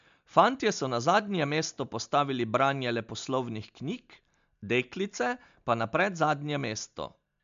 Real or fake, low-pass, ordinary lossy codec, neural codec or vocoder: real; 7.2 kHz; MP3, 64 kbps; none